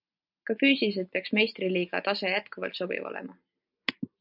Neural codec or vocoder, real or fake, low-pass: none; real; 5.4 kHz